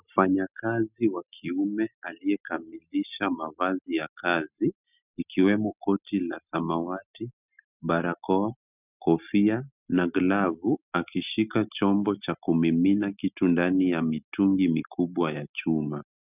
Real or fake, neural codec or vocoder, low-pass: real; none; 3.6 kHz